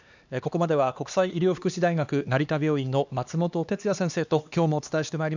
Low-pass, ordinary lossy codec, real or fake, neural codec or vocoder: 7.2 kHz; none; fake; codec, 16 kHz, 2 kbps, X-Codec, HuBERT features, trained on LibriSpeech